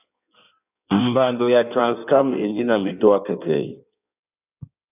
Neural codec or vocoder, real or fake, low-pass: codec, 16 kHz in and 24 kHz out, 1.1 kbps, FireRedTTS-2 codec; fake; 3.6 kHz